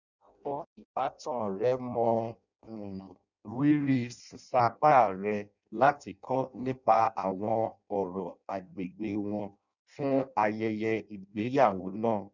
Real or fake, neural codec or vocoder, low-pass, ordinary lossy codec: fake; codec, 16 kHz in and 24 kHz out, 0.6 kbps, FireRedTTS-2 codec; 7.2 kHz; none